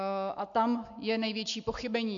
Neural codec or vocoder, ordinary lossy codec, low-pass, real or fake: none; MP3, 48 kbps; 7.2 kHz; real